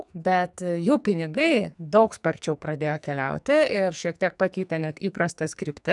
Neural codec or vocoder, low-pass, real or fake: codec, 44.1 kHz, 2.6 kbps, SNAC; 10.8 kHz; fake